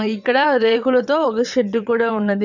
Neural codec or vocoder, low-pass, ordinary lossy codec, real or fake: vocoder, 22.05 kHz, 80 mel bands, WaveNeXt; 7.2 kHz; none; fake